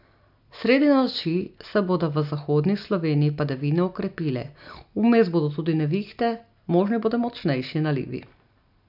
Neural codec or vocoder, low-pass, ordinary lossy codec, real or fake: none; 5.4 kHz; none; real